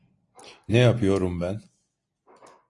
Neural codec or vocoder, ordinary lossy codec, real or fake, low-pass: none; AAC, 48 kbps; real; 10.8 kHz